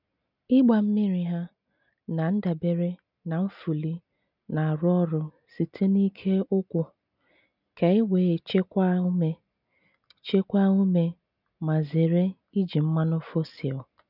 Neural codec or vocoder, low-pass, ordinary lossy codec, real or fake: none; 5.4 kHz; none; real